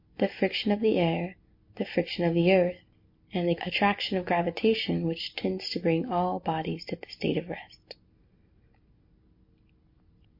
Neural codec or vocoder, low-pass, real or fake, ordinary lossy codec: none; 5.4 kHz; real; MP3, 32 kbps